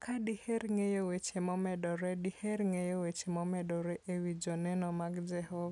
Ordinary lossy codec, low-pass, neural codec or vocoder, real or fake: none; 10.8 kHz; none; real